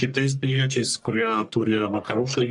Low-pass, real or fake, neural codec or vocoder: 10.8 kHz; fake; codec, 44.1 kHz, 1.7 kbps, Pupu-Codec